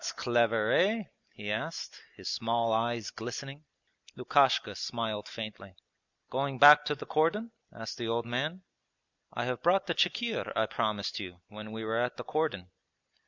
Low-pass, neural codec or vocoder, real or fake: 7.2 kHz; none; real